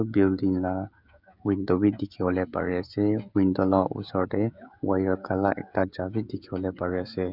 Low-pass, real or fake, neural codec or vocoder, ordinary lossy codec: 5.4 kHz; fake; codec, 16 kHz, 16 kbps, FreqCodec, smaller model; none